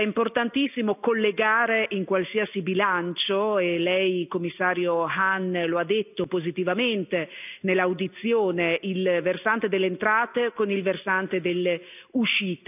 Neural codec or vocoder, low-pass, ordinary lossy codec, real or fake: none; 3.6 kHz; none; real